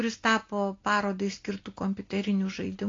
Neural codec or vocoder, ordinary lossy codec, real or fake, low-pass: none; AAC, 32 kbps; real; 7.2 kHz